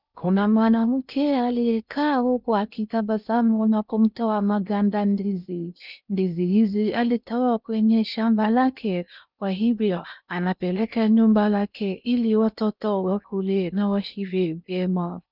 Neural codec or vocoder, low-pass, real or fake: codec, 16 kHz in and 24 kHz out, 0.6 kbps, FocalCodec, streaming, 2048 codes; 5.4 kHz; fake